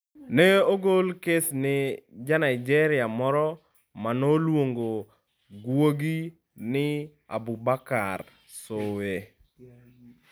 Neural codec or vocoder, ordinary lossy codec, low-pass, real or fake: none; none; none; real